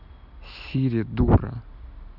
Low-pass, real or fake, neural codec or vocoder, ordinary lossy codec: 5.4 kHz; real; none; none